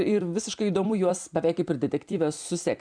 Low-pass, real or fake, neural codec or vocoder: 9.9 kHz; fake; vocoder, 44.1 kHz, 128 mel bands every 256 samples, BigVGAN v2